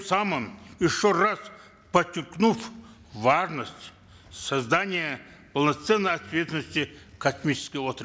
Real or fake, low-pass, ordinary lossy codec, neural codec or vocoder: real; none; none; none